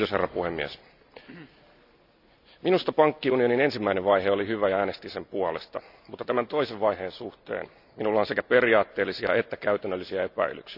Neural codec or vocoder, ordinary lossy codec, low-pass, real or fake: none; none; 5.4 kHz; real